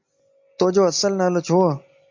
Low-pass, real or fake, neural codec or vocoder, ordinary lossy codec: 7.2 kHz; real; none; MP3, 48 kbps